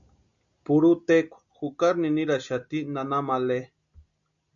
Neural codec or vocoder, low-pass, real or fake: none; 7.2 kHz; real